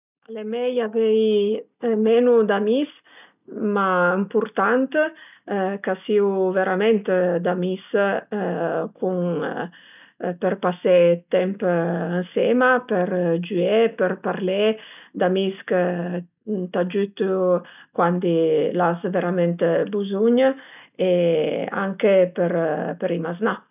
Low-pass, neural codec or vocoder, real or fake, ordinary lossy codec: 3.6 kHz; none; real; none